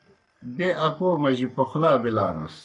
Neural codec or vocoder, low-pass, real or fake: codec, 44.1 kHz, 3.4 kbps, Pupu-Codec; 10.8 kHz; fake